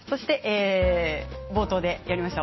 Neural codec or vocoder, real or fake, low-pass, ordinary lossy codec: none; real; 7.2 kHz; MP3, 24 kbps